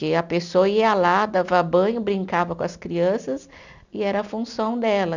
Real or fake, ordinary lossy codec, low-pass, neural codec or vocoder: real; none; 7.2 kHz; none